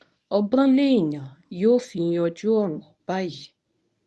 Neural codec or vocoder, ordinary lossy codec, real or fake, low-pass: codec, 24 kHz, 0.9 kbps, WavTokenizer, medium speech release version 1; none; fake; none